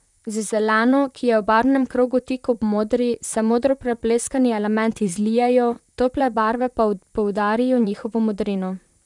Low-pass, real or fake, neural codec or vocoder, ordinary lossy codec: 10.8 kHz; fake; vocoder, 44.1 kHz, 128 mel bands, Pupu-Vocoder; none